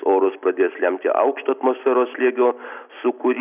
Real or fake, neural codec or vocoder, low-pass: real; none; 3.6 kHz